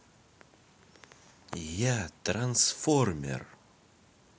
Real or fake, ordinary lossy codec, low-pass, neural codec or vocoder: real; none; none; none